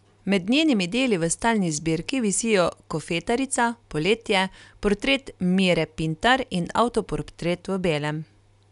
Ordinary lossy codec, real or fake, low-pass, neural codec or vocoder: none; real; 10.8 kHz; none